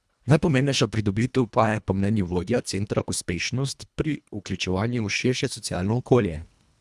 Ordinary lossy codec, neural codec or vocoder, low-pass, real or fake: none; codec, 24 kHz, 1.5 kbps, HILCodec; none; fake